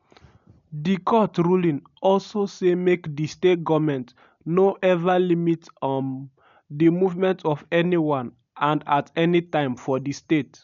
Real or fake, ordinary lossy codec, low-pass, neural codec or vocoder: real; none; 7.2 kHz; none